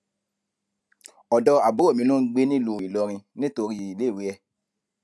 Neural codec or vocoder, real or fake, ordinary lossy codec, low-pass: none; real; none; none